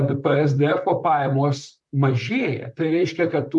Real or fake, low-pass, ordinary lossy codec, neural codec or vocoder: fake; 10.8 kHz; MP3, 96 kbps; vocoder, 44.1 kHz, 128 mel bands, Pupu-Vocoder